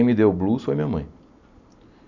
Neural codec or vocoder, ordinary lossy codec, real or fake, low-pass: none; none; real; 7.2 kHz